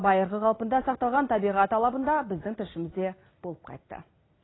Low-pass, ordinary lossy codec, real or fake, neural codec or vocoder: 7.2 kHz; AAC, 16 kbps; real; none